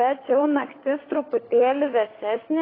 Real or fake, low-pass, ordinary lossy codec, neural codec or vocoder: fake; 5.4 kHz; AAC, 24 kbps; codec, 16 kHz, 16 kbps, FunCodec, trained on LibriTTS, 50 frames a second